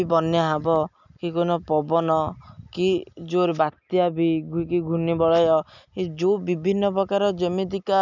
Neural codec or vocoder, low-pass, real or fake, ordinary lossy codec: none; 7.2 kHz; real; none